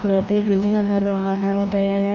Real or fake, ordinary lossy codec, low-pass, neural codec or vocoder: fake; none; 7.2 kHz; codec, 16 kHz, 1 kbps, FreqCodec, larger model